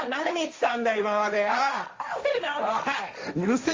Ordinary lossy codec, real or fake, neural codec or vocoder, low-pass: Opus, 32 kbps; fake; codec, 16 kHz, 1.1 kbps, Voila-Tokenizer; 7.2 kHz